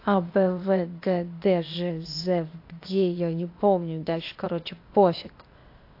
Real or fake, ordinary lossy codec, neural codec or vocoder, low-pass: fake; AAC, 32 kbps; codec, 16 kHz, 0.8 kbps, ZipCodec; 5.4 kHz